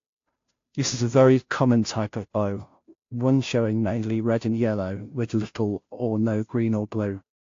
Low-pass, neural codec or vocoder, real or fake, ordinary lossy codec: 7.2 kHz; codec, 16 kHz, 0.5 kbps, FunCodec, trained on Chinese and English, 25 frames a second; fake; AAC, 48 kbps